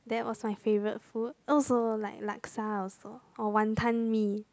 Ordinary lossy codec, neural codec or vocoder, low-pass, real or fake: none; none; none; real